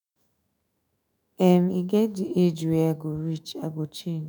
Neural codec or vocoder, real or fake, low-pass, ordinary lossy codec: autoencoder, 48 kHz, 128 numbers a frame, DAC-VAE, trained on Japanese speech; fake; none; none